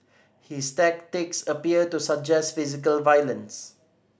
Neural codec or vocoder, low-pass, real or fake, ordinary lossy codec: none; none; real; none